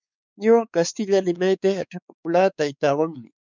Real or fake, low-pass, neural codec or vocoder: fake; 7.2 kHz; codec, 16 kHz, 4 kbps, X-Codec, WavLM features, trained on Multilingual LibriSpeech